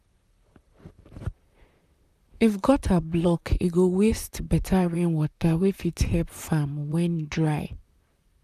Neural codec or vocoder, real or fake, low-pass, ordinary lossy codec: vocoder, 44.1 kHz, 128 mel bands, Pupu-Vocoder; fake; 14.4 kHz; none